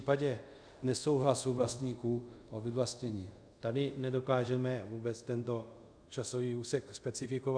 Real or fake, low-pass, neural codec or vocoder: fake; 9.9 kHz; codec, 24 kHz, 0.5 kbps, DualCodec